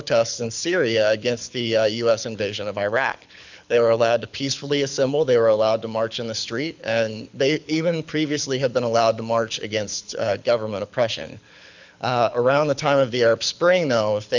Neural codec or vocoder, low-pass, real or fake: codec, 24 kHz, 6 kbps, HILCodec; 7.2 kHz; fake